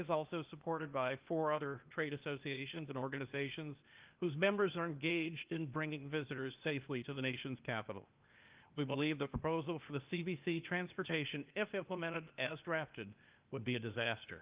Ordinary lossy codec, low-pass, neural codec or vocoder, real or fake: Opus, 24 kbps; 3.6 kHz; codec, 16 kHz, 0.8 kbps, ZipCodec; fake